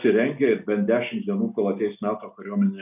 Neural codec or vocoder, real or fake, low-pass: none; real; 3.6 kHz